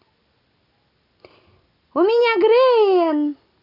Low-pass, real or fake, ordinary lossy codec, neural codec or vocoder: 5.4 kHz; real; none; none